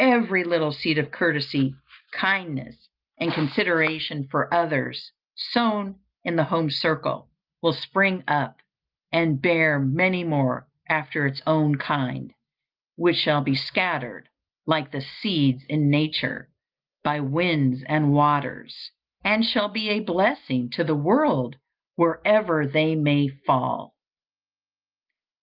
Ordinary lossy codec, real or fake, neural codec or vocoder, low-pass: Opus, 32 kbps; real; none; 5.4 kHz